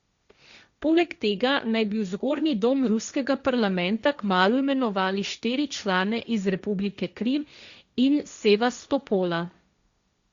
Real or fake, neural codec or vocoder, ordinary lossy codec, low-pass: fake; codec, 16 kHz, 1.1 kbps, Voila-Tokenizer; Opus, 64 kbps; 7.2 kHz